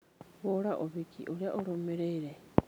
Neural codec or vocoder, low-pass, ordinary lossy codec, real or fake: none; none; none; real